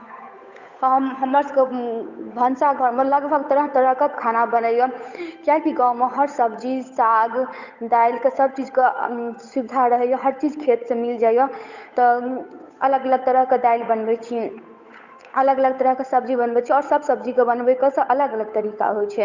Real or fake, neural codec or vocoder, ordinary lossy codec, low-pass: fake; codec, 16 kHz, 8 kbps, FunCodec, trained on Chinese and English, 25 frames a second; none; 7.2 kHz